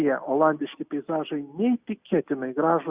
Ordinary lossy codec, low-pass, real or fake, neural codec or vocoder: Opus, 64 kbps; 3.6 kHz; real; none